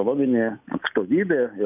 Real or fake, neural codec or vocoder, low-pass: real; none; 3.6 kHz